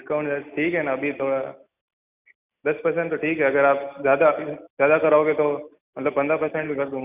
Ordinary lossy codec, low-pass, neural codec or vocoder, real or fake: none; 3.6 kHz; none; real